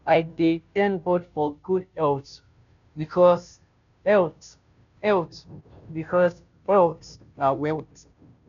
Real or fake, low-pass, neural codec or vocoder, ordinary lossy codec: fake; 7.2 kHz; codec, 16 kHz, 0.5 kbps, FunCodec, trained on Chinese and English, 25 frames a second; none